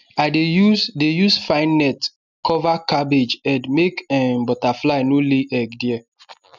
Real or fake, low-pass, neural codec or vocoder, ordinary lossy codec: real; 7.2 kHz; none; none